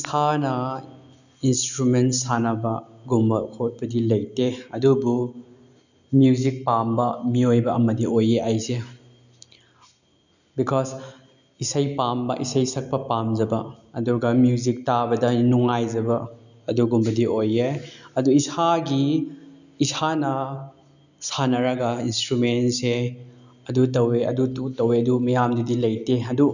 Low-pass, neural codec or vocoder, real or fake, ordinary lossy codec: 7.2 kHz; none; real; none